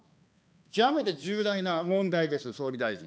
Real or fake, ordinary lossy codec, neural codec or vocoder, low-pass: fake; none; codec, 16 kHz, 2 kbps, X-Codec, HuBERT features, trained on balanced general audio; none